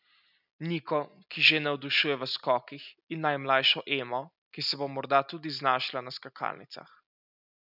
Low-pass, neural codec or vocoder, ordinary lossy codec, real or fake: 5.4 kHz; none; none; real